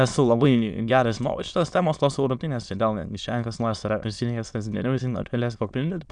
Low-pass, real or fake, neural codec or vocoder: 9.9 kHz; fake; autoencoder, 22.05 kHz, a latent of 192 numbers a frame, VITS, trained on many speakers